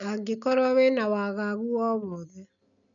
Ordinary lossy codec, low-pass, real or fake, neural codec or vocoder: none; 7.2 kHz; real; none